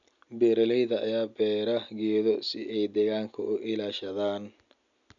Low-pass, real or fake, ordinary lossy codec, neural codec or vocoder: 7.2 kHz; real; none; none